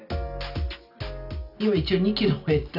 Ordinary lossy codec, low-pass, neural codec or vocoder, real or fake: none; 5.4 kHz; none; real